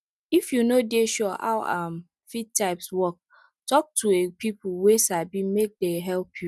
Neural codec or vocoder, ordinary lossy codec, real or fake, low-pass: none; none; real; none